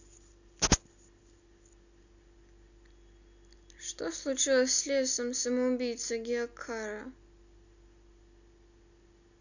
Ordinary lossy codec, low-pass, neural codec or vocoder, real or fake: none; 7.2 kHz; none; real